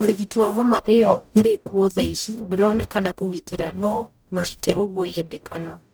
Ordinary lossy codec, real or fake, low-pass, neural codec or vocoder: none; fake; none; codec, 44.1 kHz, 0.9 kbps, DAC